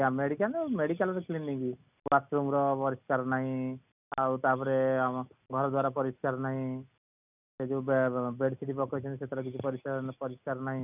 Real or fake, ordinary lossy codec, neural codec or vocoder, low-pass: real; none; none; 3.6 kHz